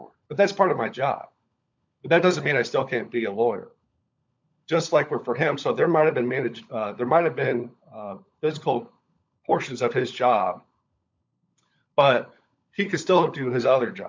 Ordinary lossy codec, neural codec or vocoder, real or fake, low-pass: MP3, 64 kbps; codec, 16 kHz, 16 kbps, FunCodec, trained on LibriTTS, 50 frames a second; fake; 7.2 kHz